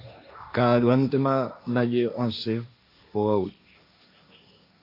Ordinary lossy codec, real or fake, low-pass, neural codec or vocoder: AAC, 32 kbps; fake; 5.4 kHz; codec, 16 kHz, 1.1 kbps, Voila-Tokenizer